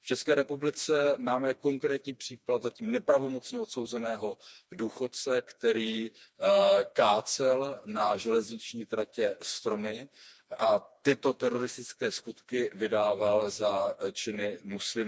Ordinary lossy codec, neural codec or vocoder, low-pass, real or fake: none; codec, 16 kHz, 2 kbps, FreqCodec, smaller model; none; fake